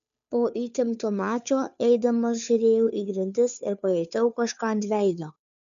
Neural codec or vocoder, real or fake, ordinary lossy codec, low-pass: codec, 16 kHz, 2 kbps, FunCodec, trained on Chinese and English, 25 frames a second; fake; MP3, 96 kbps; 7.2 kHz